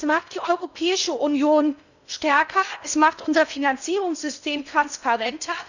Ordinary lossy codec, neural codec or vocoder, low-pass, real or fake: none; codec, 16 kHz in and 24 kHz out, 0.6 kbps, FocalCodec, streaming, 2048 codes; 7.2 kHz; fake